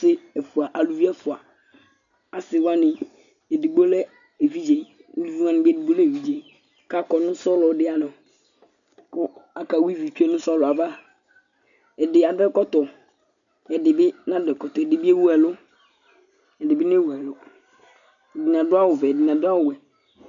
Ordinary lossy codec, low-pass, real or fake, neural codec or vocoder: MP3, 96 kbps; 7.2 kHz; real; none